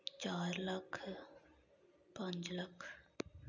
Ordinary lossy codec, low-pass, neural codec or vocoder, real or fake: none; 7.2 kHz; none; real